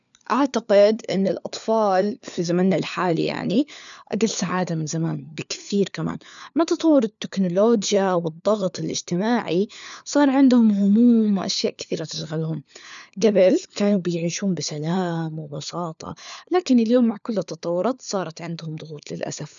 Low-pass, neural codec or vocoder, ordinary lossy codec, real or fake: 7.2 kHz; codec, 16 kHz, 4 kbps, FunCodec, trained on LibriTTS, 50 frames a second; none; fake